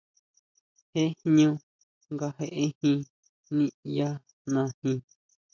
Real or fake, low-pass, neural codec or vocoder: real; 7.2 kHz; none